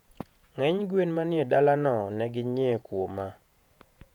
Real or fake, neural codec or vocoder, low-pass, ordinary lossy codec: fake; vocoder, 48 kHz, 128 mel bands, Vocos; 19.8 kHz; none